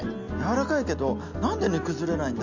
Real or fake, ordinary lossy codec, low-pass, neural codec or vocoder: real; none; 7.2 kHz; none